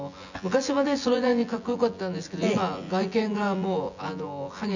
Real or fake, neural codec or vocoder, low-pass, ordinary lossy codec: fake; vocoder, 24 kHz, 100 mel bands, Vocos; 7.2 kHz; none